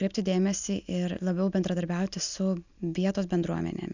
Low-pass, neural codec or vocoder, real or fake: 7.2 kHz; none; real